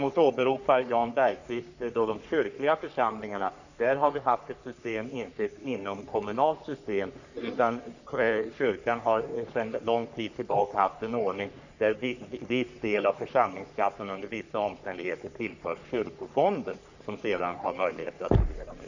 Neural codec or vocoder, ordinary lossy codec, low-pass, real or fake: codec, 44.1 kHz, 3.4 kbps, Pupu-Codec; none; 7.2 kHz; fake